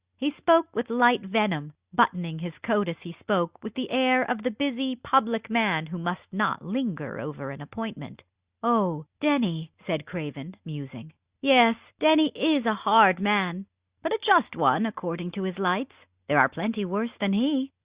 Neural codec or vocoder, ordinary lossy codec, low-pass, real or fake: none; Opus, 64 kbps; 3.6 kHz; real